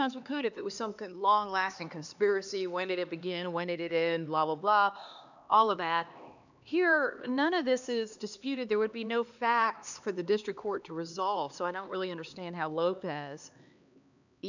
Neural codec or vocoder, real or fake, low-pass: codec, 16 kHz, 2 kbps, X-Codec, HuBERT features, trained on LibriSpeech; fake; 7.2 kHz